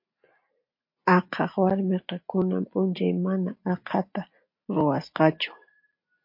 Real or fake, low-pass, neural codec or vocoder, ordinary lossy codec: real; 5.4 kHz; none; MP3, 32 kbps